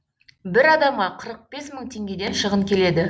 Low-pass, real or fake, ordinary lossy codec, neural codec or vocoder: none; real; none; none